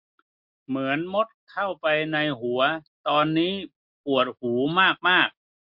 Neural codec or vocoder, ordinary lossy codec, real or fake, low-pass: none; MP3, 48 kbps; real; 5.4 kHz